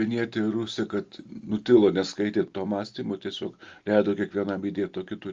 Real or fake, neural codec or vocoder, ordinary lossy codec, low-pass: real; none; Opus, 32 kbps; 7.2 kHz